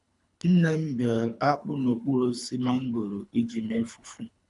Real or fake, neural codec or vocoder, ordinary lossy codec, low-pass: fake; codec, 24 kHz, 3 kbps, HILCodec; none; 10.8 kHz